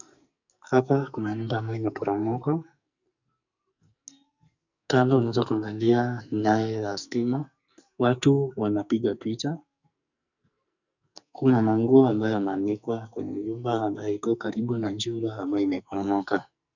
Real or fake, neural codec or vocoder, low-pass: fake; codec, 44.1 kHz, 2.6 kbps, SNAC; 7.2 kHz